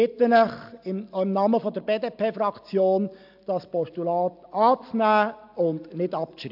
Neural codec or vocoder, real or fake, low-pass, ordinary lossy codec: none; real; 5.4 kHz; AAC, 48 kbps